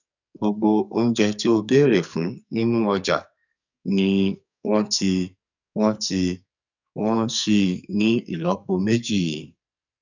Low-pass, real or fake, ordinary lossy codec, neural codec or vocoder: 7.2 kHz; fake; none; codec, 44.1 kHz, 2.6 kbps, SNAC